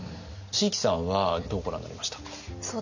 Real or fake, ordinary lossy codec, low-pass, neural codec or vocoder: real; none; 7.2 kHz; none